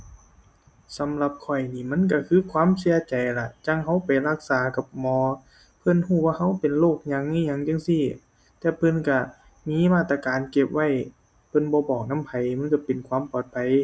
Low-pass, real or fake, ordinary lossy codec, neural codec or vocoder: none; real; none; none